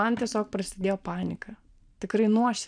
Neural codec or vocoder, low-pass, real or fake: vocoder, 44.1 kHz, 128 mel bands every 512 samples, BigVGAN v2; 9.9 kHz; fake